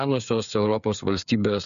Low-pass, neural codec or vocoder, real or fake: 7.2 kHz; codec, 16 kHz, 8 kbps, FreqCodec, smaller model; fake